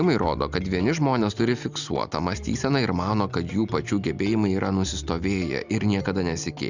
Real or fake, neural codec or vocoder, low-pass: real; none; 7.2 kHz